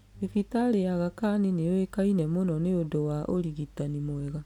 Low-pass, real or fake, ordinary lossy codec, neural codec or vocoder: 19.8 kHz; real; none; none